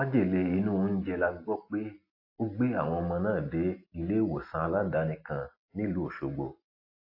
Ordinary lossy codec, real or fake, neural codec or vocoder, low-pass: AAC, 32 kbps; real; none; 5.4 kHz